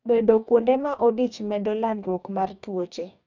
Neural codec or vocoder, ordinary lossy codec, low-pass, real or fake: codec, 44.1 kHz, 2.6 kbps, DAC; none; 7.2 kHz; fake